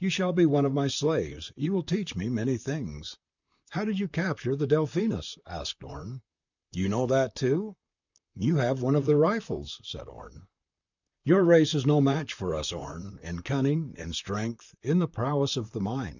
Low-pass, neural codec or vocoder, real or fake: 7.2 kHz; vocoder, 44.1 kHz, 128 mel bands, Pupu-Vocoder; fake